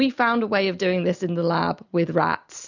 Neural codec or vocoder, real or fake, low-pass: none; real; 7.2 kHz